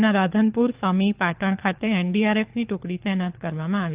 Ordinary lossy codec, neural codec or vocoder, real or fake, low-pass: Opus, 24 kbps; codec, 24 kHz, 6 kbps, HILCodec; fake; 3.6 kHz